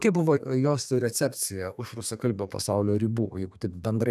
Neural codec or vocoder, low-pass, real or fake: codec, 32 kHz, 1.9 kbps, SNAC; 14.4 kHz; fake